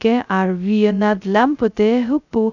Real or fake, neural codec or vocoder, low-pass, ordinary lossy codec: fake; codec, 16 kHz, 0.2 kbps, FocalCodec; 7.2 kHz; none